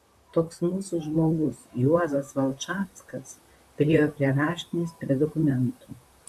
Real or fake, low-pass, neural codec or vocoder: fake; 14.4 kHz; vocoder, 44.1 kHz, 128 mel bands, Pupu-Vocoder